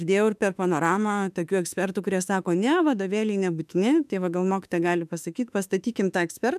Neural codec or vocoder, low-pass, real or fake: autoencoder, 48 kHz, 32 numbers a frame, DAC-VAE, trained on Japanese speech; 14.4 kHz; fake